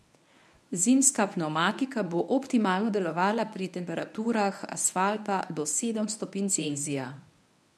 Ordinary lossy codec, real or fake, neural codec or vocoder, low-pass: none; fake; codec, 24 kHz, 0.9 kbps, WavTokenizer, medium speech release version 1; none